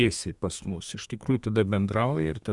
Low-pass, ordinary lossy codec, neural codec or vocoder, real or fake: 10.8 kHz; Opus, 64 kbps; codec, 32 kHz, 1.9 kbps, SNAC; fake